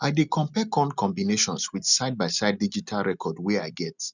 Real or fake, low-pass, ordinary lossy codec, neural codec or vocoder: real; 7.2 kHz; none; none